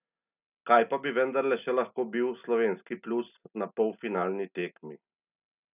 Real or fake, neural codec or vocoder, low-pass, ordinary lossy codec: real; none; 3.6 kHz; none